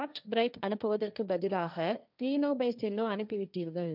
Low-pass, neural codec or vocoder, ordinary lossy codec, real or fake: 5.4 kHz; codec, 16 kHz, 1.1 kbps, Voila-Tokenizer; none; fake